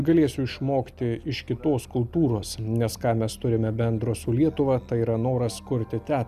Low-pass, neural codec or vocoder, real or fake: 14.4 kHz; none; real